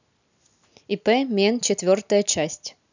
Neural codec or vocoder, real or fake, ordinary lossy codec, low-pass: none; real; none; 7.2 kHz